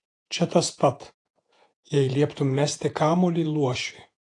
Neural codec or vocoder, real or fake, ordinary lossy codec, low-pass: vocoder, 48 kHz, 128 mel bands, Vocos; fake; AAC, 48 kbps; 10.8 kHz